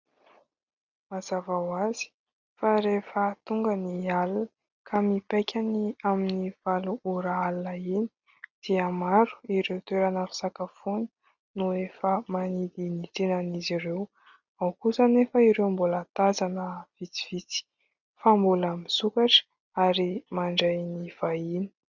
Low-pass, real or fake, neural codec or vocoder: 7.2 kHz; real; none